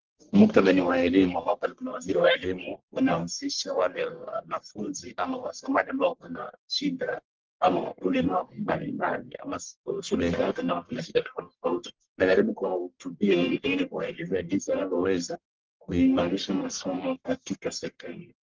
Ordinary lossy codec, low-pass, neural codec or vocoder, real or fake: Opus, 16 kbps; 7.2 kHz; codec, 44.1 kHz, 1.7 kbps, Pupu-Codec; fake